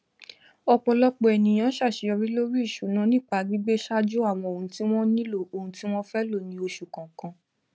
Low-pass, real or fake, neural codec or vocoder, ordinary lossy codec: none; real; none; none